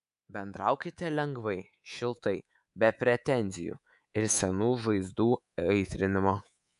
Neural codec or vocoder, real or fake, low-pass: codec, 24 kHz, 3.1 kbps, DualCodec; fake; 10.8 kHz